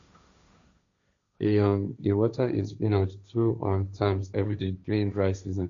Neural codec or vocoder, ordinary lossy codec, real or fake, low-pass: codec, 16 kHz, 1.1 kbps, Voila-Tokenizer; none; fake; 7.2 kHz